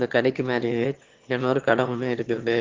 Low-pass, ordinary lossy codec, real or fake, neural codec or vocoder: 7.2 kHz; Opus, 16 kbps; fake; autoencoder, 22.05 kHz, a latent of 192 numbers a frame, VITS, trained on one speaker